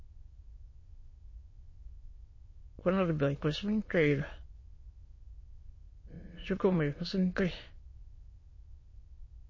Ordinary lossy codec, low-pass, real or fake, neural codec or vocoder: MP3, 32 kbps; 7.2 kHz; fake; autoencoder, 22.05 kHz, a latent of 192 numbers a frame, VITS, trained on many speakers